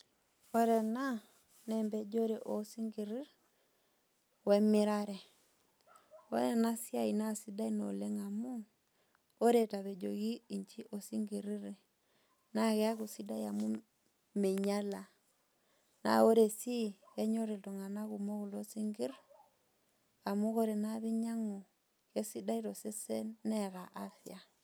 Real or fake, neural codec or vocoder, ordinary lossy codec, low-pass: real; none; none; none